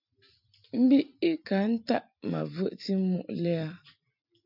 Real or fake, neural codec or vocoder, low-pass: fake; vocoder, 44.1 kHz, 128 mel bands every 256 samples, BigVGAN v2; 5.4 kHz